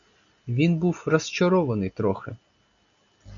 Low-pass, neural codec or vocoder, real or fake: 7.2 kHz; none; real